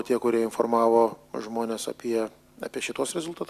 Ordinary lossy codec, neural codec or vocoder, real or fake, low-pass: AAC, 96 kbps; none; real; 14.4 kHz